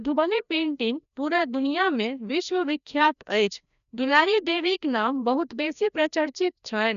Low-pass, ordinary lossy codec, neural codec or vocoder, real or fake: 7.2 kHz; none; codec, 16 kHz, 1 kbps, FreqCodec, larger model; fake